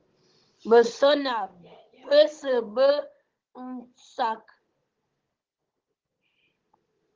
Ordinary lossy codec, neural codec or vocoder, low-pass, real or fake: Opus, 16 kbps; codec, 16 kHz, 16 kbps, FunCodec, trained on Chinese and English, 50 frames a second; 7.2 kHz; fake